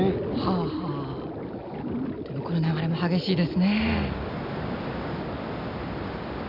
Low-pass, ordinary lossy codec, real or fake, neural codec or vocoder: 5.4 kHz; none; real; none